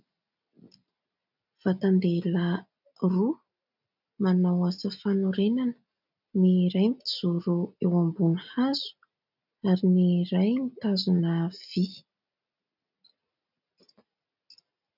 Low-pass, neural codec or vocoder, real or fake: 5.4 kHz; none; real